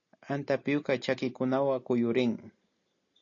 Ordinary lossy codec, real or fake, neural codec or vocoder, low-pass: AAC, 48 kbps; real; none; 7.2 kHz